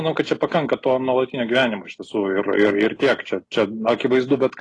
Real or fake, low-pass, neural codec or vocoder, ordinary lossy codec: real; 10.8 kHz; none; AAC, 32 kbps